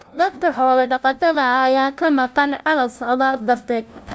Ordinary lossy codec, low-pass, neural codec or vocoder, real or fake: none; none; codec, 16 kHz, 0.5 kbps, FunCodec, trained on LibriTTS, 25 frames a second; fake